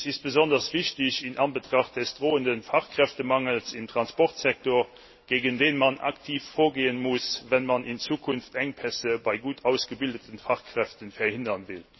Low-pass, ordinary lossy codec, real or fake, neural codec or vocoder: 7.2 kHz; MP3, 24 kbps; real; none